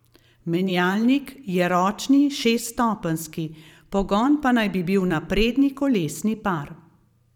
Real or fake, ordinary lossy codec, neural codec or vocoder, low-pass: fake; none; vocoder, 44.1 kHz, 128 mel bands every 512 samples, BigVGAN v2; 19.8 kHz